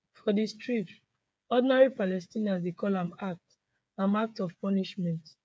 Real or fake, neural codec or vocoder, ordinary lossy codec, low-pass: fake; codec, 16 kHz, 8 kbps, FreqCodec, smaller model; none; none